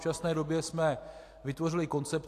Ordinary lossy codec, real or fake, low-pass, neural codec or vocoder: AAC, 64 kbps; real; 14.4 kHz; none